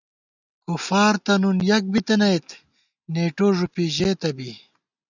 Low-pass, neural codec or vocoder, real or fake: 7.2 kHz; none; real